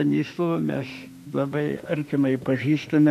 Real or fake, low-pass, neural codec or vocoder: fake; 14.4 kHz; autoencoder, 48 kHz, 32 numbers a frame, DAC-VAE, trained on Japanese speech